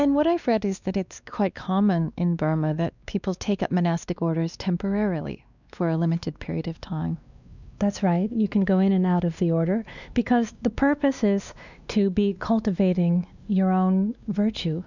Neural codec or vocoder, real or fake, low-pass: codec, 16 kHz, 2 kbps, X-Codec, WavLM features, trained on Multilingual LibriSpeech; fake; 7.2 kHz